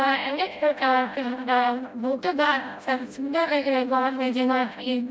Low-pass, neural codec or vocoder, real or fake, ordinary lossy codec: none; codec, 16 kHz, 0.5 kbps, FreqCodec, smaller model; fake; none